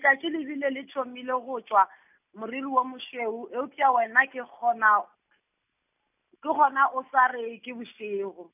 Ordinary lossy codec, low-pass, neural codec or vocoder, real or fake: none; 3.6 kHz; none; real